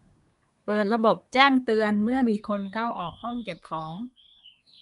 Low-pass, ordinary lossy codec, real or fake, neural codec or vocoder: 10.8 kHz; none; fake; codec, 24 kHz, 1 kbps, SNAC